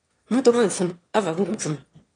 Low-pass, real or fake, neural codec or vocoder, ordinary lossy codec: 9.9 kHz; fake; autoencoder, 22.05 kHz, a latent of 192 numbers a frame, VITS, trained on one speaker; AAC, 32 kbps